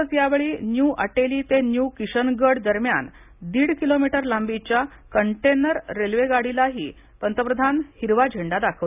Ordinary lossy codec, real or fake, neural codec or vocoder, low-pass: none; real; none; 3.6 kHz